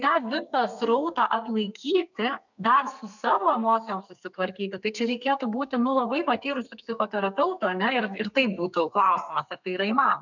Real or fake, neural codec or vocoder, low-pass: fake; codec, 32 kHz, 1.9 kbps, SNAC; 7.2 kHz